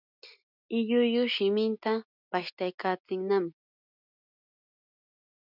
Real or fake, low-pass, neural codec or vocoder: real; 5.4 kHz; none